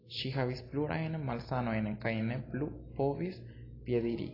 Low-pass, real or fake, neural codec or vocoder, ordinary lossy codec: 5.4 kHz; real; none; AAC, 24 kbps